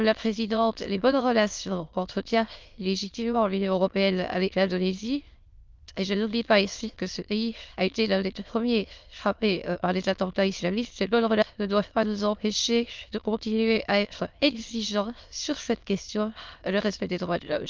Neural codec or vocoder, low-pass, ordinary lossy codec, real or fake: autoencoder, 22.05 kHz, a latent of 192 numbers a frame, VITS, trained on many speakers; 7.2 kHz; Opus, 32 kbps; fake